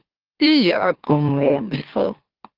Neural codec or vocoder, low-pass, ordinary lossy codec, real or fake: autoencoder, 44.1 kHz, a latent of 192 numbers a frame, MeloTTS; 5.4 kHz; Opus, 16 kbps; fake